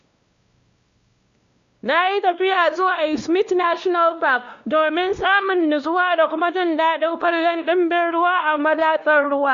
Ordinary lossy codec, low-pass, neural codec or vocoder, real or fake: Opus, 64 kbps; 7.2 kHz; codec, 16 kHz, 1 kbps, X-Codec, WavLM features, trained on Multilingual LibriSpeech; fake